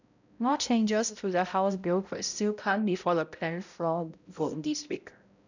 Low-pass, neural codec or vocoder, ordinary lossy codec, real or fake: 7.2 kHz; codec, 16 kHz, 0.5 kbps, X-Codec, HuBERT features, trained on balanced general audio; none; fake